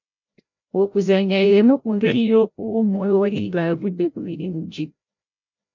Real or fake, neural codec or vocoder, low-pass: fake; codec, 16 kHz, 0.5 kbps, FreqCodec, larger model; 7.2 kHz